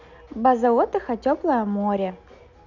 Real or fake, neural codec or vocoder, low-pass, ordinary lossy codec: real; none; 7.2 kHz; none